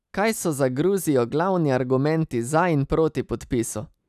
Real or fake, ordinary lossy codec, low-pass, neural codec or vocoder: real; none; 14.4 kHz; none